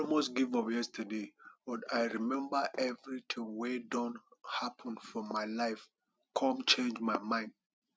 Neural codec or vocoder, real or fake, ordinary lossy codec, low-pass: none; real; none; none